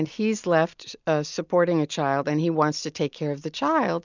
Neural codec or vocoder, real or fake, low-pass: none; real; 7.2 kHz